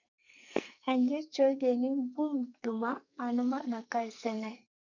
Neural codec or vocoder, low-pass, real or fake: codec, 44.1 kHz, 2.6 kbps, SNAC; 7.2 kHz; fake